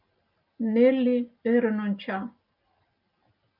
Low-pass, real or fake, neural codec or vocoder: 5.4 kHz; real; none